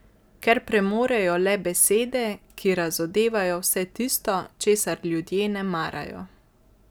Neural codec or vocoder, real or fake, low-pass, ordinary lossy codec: none; real; none; none